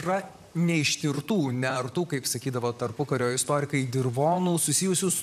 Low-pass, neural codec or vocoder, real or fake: 14.4 kHz; vocoder, 44.1 kHz, 128 mel bands, Pupu-Vocoder; fake